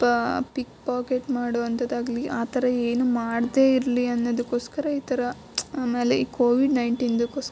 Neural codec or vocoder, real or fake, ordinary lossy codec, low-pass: none; real; none; none